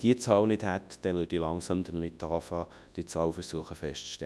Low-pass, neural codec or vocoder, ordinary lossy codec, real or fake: none; codec, 24 kHz, 0.9 kbps, WavTokenizer, large speech release; none; fake